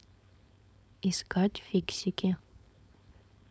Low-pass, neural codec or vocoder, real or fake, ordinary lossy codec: none; codec, 16 kHz, 4.8 kbps, FACodec; fake; none